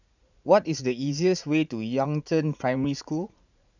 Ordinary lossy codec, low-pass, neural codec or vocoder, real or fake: none; 7.2 kHz; vocoder, 44.1 kHz, 80 mel bands, Vocos; fake